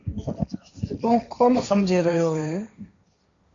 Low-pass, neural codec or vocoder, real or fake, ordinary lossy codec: 7.2 kHz; codec, 16 kHz, 1.1 kbps, Voila-Tokenizer; fake; MP3, 64 kbps